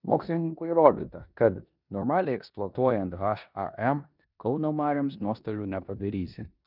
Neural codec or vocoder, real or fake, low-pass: codec, 16 kHz in and 24 kHz out, 0.9 kbps, LongCat-Audio-Codec, four codebook decoder; fake; 5.4 kHz